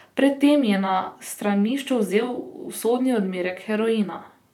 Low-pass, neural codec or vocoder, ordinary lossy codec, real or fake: 19.8 kHz; vocoder, 44.1 kHz, 128 mel bands, Pupu-Vocoder; none; fake